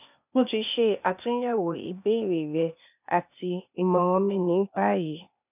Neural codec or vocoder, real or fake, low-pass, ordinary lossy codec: codec, 16 kHz, 0.8 kbps, ZipCodec; fake; 3.6 kHz; none